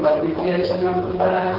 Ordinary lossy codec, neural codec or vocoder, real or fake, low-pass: Opus, 16 kbps; codec, 16 kHz, 4 kbps, FreqCodec, smaller model; fake; 5.4 kHz